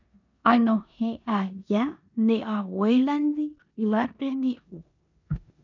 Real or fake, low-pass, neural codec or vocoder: fake; 7.2 kHz; codec, 16 kHz in and 24 kHz out, 0.9 kbps, LongCat-Audio-Codec, four codebook decoder